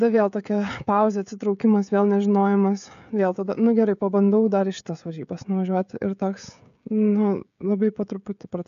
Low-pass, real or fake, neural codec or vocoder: 7.2 kHz; fake; codec, 16 kHz, 16 kbps, FreqCodec, smaller model